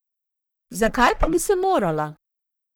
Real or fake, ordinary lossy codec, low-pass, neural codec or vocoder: fake; none; none; codec, 44.1 kHz, 1.7 kbps, Pupu-Codec